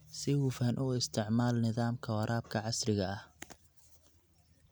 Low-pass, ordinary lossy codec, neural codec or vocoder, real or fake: none; none; none; real